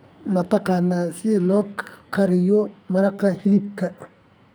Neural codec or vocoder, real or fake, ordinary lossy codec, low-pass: codec, 44.1 kHz, 2.6 kbps, SNAC; fake; none; none